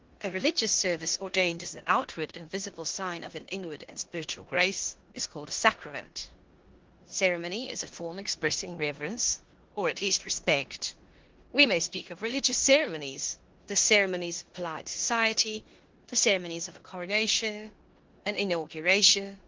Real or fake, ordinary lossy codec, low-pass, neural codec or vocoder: fake; Opus, 16 kbps; 7.2 kHz; codec, 16 kHz in and 24 kHz out, 0.9 kbps, LongCat-Audio-Codec, four codebook decoder